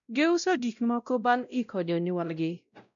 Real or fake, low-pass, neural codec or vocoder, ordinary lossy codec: fake; 7.2 kHz; codec, 16 kHz, 0.5 kbps, X-Codec, WavLM features, trained on Multilingual LibriSpeech; none